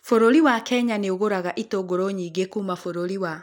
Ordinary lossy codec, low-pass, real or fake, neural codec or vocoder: none; 19.8 kHz; real; none